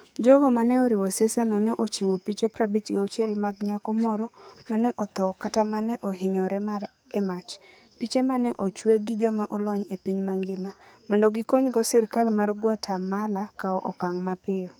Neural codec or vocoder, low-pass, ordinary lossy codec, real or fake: codec, 44.1 kHz, 2.6 kbps, SNAC; none; none; fake